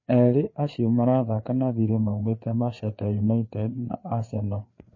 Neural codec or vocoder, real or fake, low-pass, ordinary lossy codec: codec, 16 kHz, 4 kbps, FreqCodec, larger model; fake; 7.2 kHz; MP3, 32 kbps